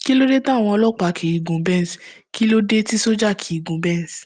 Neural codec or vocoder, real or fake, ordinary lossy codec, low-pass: none; real; Opus, 24 kbps; 9.9 kHz